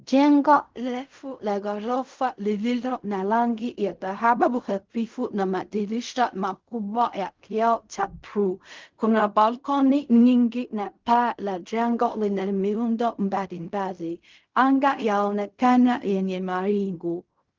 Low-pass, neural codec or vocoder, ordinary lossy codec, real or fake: 7.2 kHz; codec, 16 kHz in and 24 kHz out, 0.4 kbps, LongCat-Audio-Codec, fine tuned four codebook decoder; Opus, 24 kbps; fake